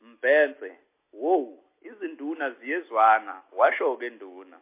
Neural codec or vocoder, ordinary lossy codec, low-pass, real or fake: none; MP3, 24 kbps; 3.6 kHz; real